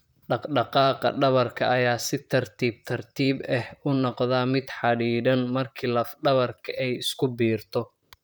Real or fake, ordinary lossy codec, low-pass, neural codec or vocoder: fake; none; none; vocoder, 44.1 kHz, 128 mel bands, Pupu-Vocoder